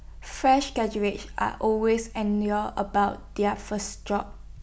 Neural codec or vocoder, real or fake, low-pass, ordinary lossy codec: none; real; none; none